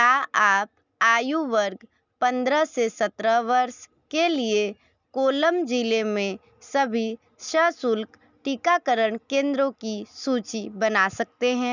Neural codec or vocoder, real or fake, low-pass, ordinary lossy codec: none; real; 7.2 kHz; none